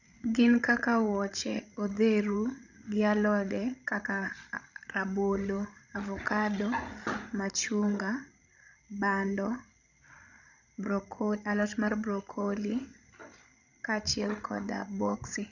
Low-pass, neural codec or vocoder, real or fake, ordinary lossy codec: 7.2 kHz; codec, 16 kHz, 16 kbps, FreqCodec, larger model; fake; none